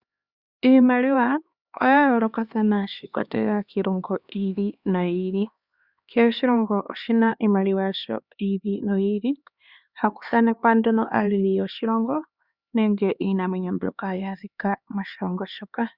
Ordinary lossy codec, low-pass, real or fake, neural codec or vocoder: Opus, 64 kbps; 5.4 kHz; fake; codec, 16 kHz, 2 kbps, X-Codec, HuBERT features, trained on LibriSpeech